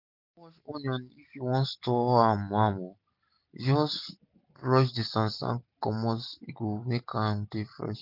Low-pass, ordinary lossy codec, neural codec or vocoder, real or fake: 5.4 kHz; none; none; real